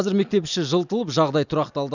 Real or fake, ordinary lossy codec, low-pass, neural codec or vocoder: real; none; 7.2 kHz; none